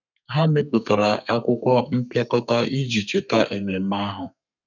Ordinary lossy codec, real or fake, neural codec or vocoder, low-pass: none; fake; codec, 32 kHz, 1.9 kbps, SNAC; 7.2 kHz